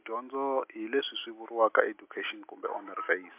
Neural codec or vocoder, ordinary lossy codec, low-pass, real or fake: none; MP3, 32 kbps; 3.6 kHz; real